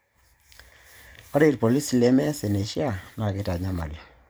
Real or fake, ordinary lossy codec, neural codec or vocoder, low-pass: fake; none; vocoder, 44.1 kHz, 128 mel bands every 512 samples, BigVGAN v2; none